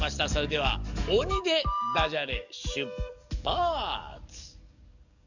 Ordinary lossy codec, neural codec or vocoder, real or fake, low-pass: none; codec, 44.1 kHz, 7.8 kbps, DAC; fake; 7.2 kHz